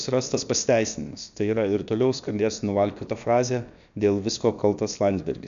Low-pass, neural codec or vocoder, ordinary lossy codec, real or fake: 7.2 kHz; codec, 16 kHz, about 1 kbps, DyCAST, with the encoder's durations; MP3, 64 kbps; fake